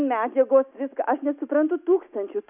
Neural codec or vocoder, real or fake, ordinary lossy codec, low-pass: none; real; AAC, 32 kbps; 3.6 kHz